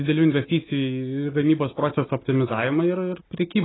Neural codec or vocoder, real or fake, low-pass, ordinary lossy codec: none; real; 7.2 kHz; AAC, 16 kbps